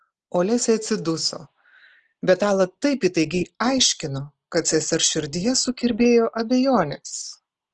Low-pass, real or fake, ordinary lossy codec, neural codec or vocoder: 10.8 kHz; real; Opus, 16 kbps; none